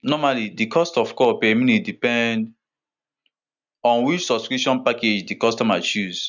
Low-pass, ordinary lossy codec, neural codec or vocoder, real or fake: 7.2 kHz; none; none; real